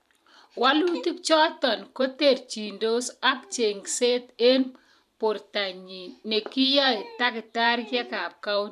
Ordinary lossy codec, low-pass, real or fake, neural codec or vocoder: none; 14.4 kHz; fake; vocoder, 44.1 kHz, 128 mel bands every 512 samples, BigVGAN v2